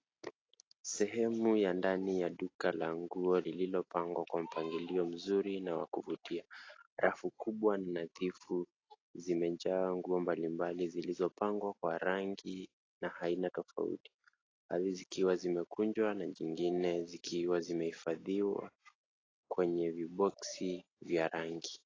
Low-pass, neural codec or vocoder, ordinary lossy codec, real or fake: 7.2 kHz; none; AAC, 32 kbps; real